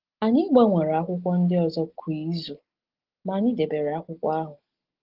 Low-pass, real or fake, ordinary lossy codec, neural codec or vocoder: 5.4 kHz; real; Opus, 16 kbps; none